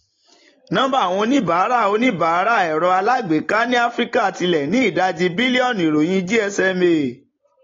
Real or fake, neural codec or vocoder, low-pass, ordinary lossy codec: real; none; 7.2 kHz; AAC, 32 kbps